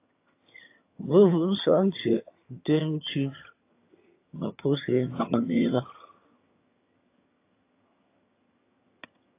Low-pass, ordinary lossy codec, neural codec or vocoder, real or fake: 3.6 kHz; AAC, 24 kbps; vocoder, 22.05 kHz, 80 mel bands, HiFi-GAN; fake